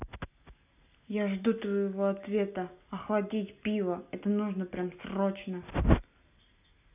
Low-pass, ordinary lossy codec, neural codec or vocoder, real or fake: 3.6 kHz; none; none; real